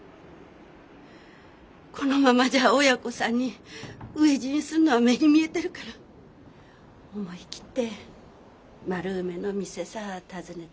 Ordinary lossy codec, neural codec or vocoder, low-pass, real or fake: none; none; none; real